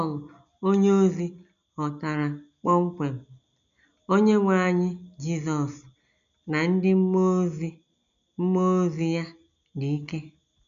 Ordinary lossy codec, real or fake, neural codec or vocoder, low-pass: none; real; none; 7.2 kHz